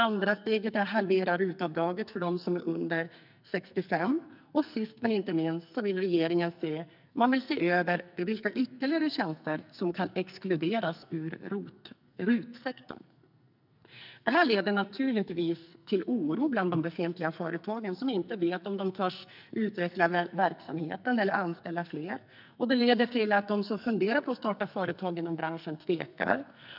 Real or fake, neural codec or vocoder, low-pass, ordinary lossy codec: fake; codec, 44.1 kHz, 2.6 kbps, SNAC; 5.4 kHz; none